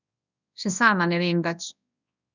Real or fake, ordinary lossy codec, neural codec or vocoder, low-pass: fake; none; codec, 24 kHz, 0.9 kbps, WavTokenizer, large speech release; 7.2 kHz